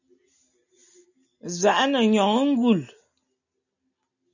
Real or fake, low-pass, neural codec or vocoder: real; 7.2 kHz; none